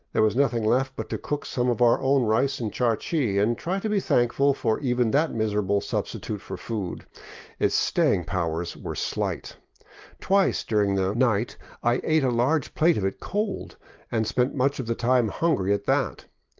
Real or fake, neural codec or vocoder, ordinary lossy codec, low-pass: real; none; Opus, 32 kbps; 7.2 kHz